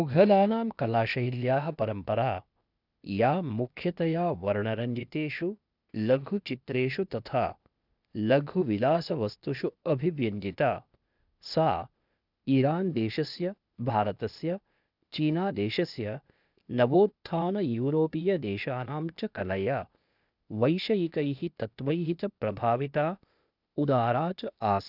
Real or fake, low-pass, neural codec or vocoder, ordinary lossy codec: fake; 5.4 kHz; codec, 16 kHz, 0.8 kbps, ZipCodec; none